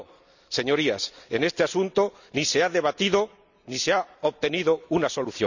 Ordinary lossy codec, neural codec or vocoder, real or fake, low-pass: none; none; real; 7.2 kHz